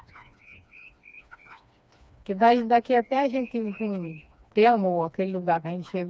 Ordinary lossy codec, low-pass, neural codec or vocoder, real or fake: none; none; codec, 16 kHz, 2 kbps, FreqCodec, smaller model; fake